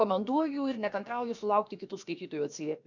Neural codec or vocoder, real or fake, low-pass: codec, 16 kHz, about 1 kbps, DyCAST, with the encoder's durations; fake; 7.2 kHz